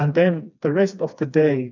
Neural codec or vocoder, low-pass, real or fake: codec, 16 kHz, 2 kbps, FreqCodec, smaller model; 7.2 kHz; fake